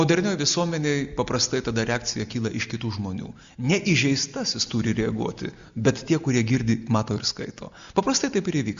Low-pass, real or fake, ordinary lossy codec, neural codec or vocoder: 7.2 kHz; real; Opus, 64 kbps; none